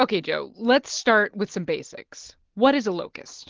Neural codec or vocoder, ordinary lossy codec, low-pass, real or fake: none; Opus, 16 kbps; 7.2 kHz; real